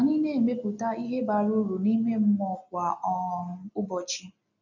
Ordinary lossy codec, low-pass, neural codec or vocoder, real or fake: none; 7.2 kHz; none; real